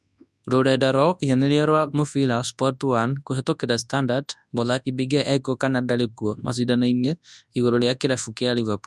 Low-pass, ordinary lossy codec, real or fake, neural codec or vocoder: none; none; fake; codec, 24 kHz, 0.9 kbps, WavTokenizer, large speech release